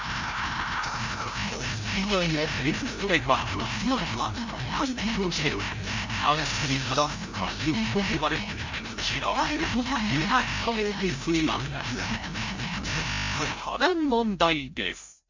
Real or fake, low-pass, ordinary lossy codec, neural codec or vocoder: fake; 7.2 kHz; MP3, 48 kbps; codec, 16 kHz, 0.5 kbps, FreqCodec, larger model